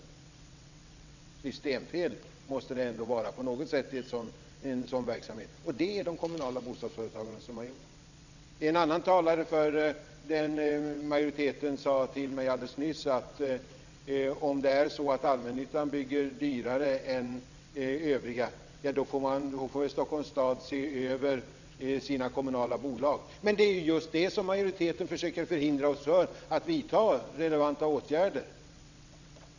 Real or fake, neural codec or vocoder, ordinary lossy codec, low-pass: fake; vocoder, 22.05 kHz, 80 mel bands, WaveNeXt; none; 7.2 kHz